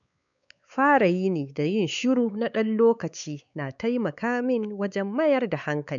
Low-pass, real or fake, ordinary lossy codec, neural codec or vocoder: 7.2 kHz; fake; none; codec, 16 kHz, 4 kbps, X-Codec, WavLM features, trained on Multilingual LibriSpeech